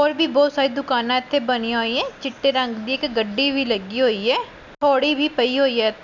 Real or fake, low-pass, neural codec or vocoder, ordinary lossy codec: real; 7.2 kHz; none; none